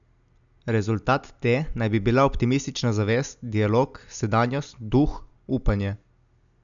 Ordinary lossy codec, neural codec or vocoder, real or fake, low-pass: none; none; real; 7.2 kHz